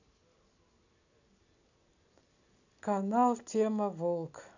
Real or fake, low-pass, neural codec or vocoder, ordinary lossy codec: real; 7.2 kHz; none; none